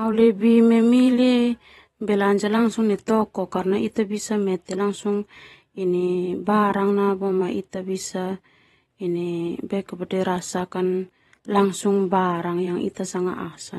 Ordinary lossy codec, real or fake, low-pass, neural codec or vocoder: AAC, 32 kbps; fake; 19.8 kHz; vocoder, 44.1 kHz, 128 mel bands every 512 samples, BigVGAN v2